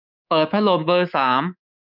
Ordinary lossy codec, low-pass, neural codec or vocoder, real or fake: none; 5.4 kHz; codec, 24 kHz, 3.1 kbps, DualCodec; fake